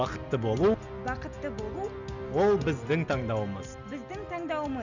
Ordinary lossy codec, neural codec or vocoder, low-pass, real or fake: none; none; 7.2 kHz; real